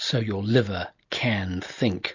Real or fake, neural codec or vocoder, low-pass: real; none; 7.2 kHz